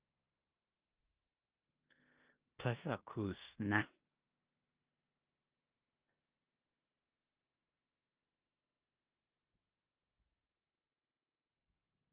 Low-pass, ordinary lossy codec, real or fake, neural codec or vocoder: 3.6 kHz; Opus, 24 kbps; fake; codec, 16 kHz in and 24 kHz out, 0.9 kbps, LongCat-Audio-Codec, four codebook decoder